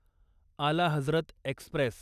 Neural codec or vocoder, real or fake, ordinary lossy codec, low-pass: none; real; none; 14.4 kHz